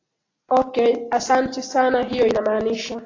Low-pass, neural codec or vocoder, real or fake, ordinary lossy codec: 7.2 kHz; none; real; AAC, 32 kbps